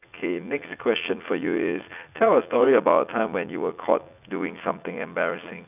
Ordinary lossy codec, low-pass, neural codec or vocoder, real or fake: none; 3.6 kHz; vocoder, 44.1 kHz, 80 mel bands, Vocos; fake